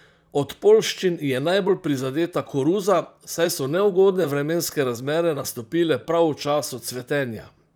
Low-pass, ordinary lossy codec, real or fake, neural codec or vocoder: none; none; fake; vocoder, 44.1 kHz, 128 mel bands, Pupu-Vocoder